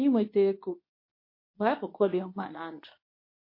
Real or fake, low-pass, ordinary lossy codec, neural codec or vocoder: fake; 5.4 kHz; MP3, 32 kbps; codec, 24 kHz, 0.9 kbps, WavTokenizer, medium speech release version 2